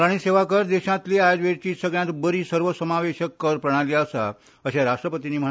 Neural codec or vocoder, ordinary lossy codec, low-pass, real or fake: none; none; none; real